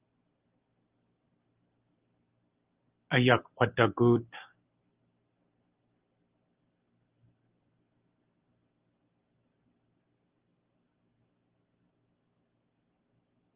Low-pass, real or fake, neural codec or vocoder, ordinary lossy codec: 3.6 kHz; real; none; Opus, 24 kbps